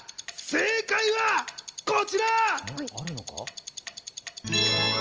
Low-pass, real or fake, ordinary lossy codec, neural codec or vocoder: 7.2 kHz; real; Opus, 24 kbps; none